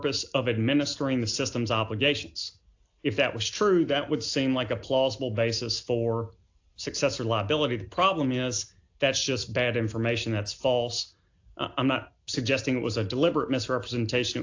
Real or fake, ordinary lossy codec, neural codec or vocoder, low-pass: real; AAC, 48 kbps; none; 7.2 kHz